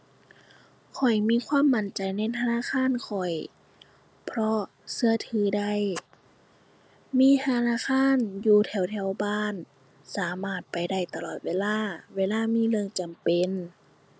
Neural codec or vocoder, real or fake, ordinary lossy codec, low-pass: none; real; none; none